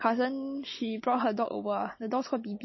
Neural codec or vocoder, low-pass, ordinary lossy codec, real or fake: none; 7.2 kHz; MP3, 24 kbps; real